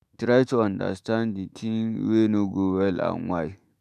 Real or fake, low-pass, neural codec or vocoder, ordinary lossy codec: fake; 14.4 kHz; autoencoder, 48 kHz, 128 numbers a frame, DAC-VAE, trained on Japanese speech; none